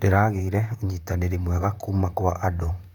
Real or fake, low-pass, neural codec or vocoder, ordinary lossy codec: fake; 19.8 kHz; vocoder, 44.1 kHz, 128 mel bands, Pupu-Vocoder; none